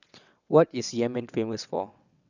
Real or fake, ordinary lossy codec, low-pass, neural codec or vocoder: fake; none; 7.2 kHz; vocoder, 22.05 kHz, 80 mel bands, WaveNeXt